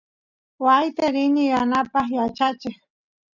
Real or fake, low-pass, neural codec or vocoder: real; 7.2 kHz; none